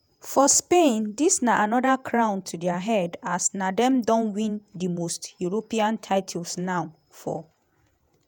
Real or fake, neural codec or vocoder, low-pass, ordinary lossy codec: fake; vocoder, 48 kHz, 128 mel bands, Vocos; none; none